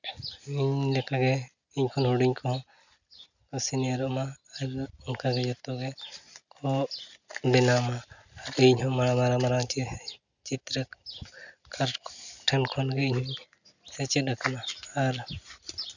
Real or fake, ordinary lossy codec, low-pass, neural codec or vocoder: real; none; 7.2 kHz; none